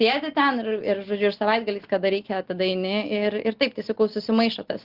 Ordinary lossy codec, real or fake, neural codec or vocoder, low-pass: Opus, 16 kbps; real; none; 5.4 kHz